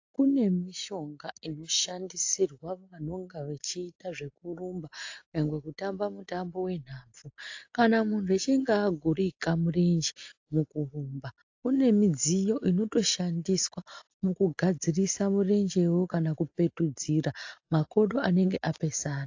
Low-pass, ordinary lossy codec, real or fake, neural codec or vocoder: 7.2 kHz; AAC, 48 kbps; real; none